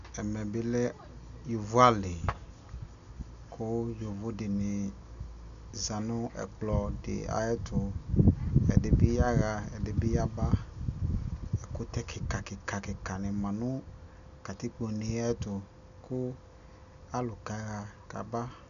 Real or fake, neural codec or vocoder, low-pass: real; none; 7.2 kHz